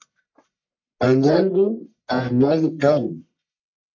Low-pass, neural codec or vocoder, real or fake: 7.2 kHz; codec, 44.1 kHz, 1.7 kbps, Pupu-Codec; fake